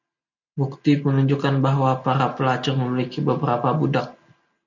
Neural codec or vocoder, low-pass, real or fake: none; 7.2 kHz; real